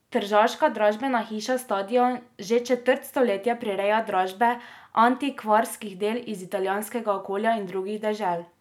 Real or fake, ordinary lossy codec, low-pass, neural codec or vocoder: real; none; 19.8 kHz; none